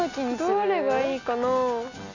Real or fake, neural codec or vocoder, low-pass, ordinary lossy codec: real; none; 7.2 kHz; none